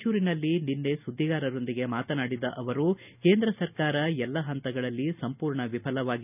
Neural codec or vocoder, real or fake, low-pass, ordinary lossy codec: none; real; 3.6 kHz; none